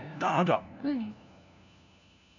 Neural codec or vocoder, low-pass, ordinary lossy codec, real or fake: codec, 16 kHz, 1 kbps, FunCodec, trained on LibriTTS, 50 frames a second; 7.2 kHz; none; fake